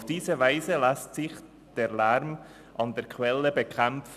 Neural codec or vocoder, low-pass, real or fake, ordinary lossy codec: none; 14.4 kHz; real; none